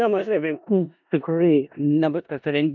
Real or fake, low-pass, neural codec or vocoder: fake; 7.2 kHz; codec, 16 kHz in and 24 kHz out, 0.4 kbps, LongCat-Audio-Codec, four codebook decoder